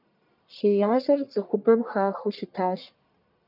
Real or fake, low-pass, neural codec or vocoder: fake; 5.4 kHz; codec, 44.1 kHz, 1.7 kbps, Pupu-Codec